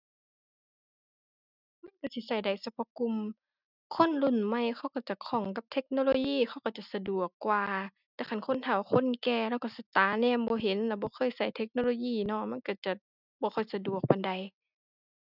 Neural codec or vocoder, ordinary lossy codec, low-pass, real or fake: none; none; 5.4 kHz; real